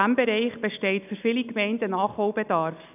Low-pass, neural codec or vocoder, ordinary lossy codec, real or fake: 3.6 kHz; none; none; real